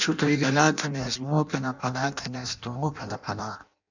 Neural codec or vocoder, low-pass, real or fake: codec, 16 kHz in and 24 kHz out, 0.6 kbps, FireRedTTS-2 codec; 7.2 kHz; fake